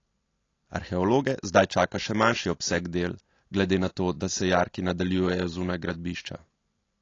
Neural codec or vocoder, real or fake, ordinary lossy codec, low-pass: none; real; AAC, 32 kbps; 7.2 kHz